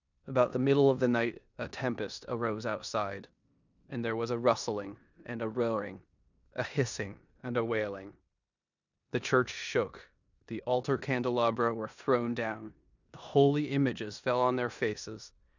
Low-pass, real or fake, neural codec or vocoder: 7.2 kHz; fake; codec, 16 kHz in and 24 kHz out, 0.9 kbps, LongCat-Audio-Codec, four codebook decoder